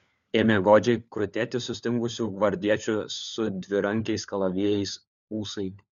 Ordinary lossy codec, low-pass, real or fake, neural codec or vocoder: MP3, 96 kbps; 7.2 kHz; fake; codec, 16 kHz, 4 kbps, FunCodec, trained on LibriTTS, 50 frames a second